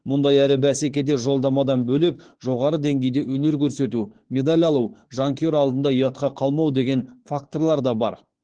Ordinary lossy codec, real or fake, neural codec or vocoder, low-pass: Opus, 16 kbps; fake; autoencoder, 48 kHz, 32 numbers a frame, DAC-VAE, trained on Japanese speech; 9.9 kHz